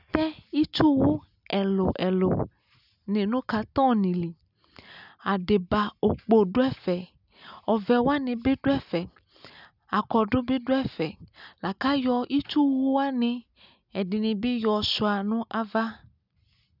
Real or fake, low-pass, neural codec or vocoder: real; 5.4 kHz; none